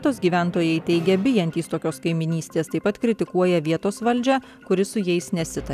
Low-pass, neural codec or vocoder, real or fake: 14.4 kHz; none; real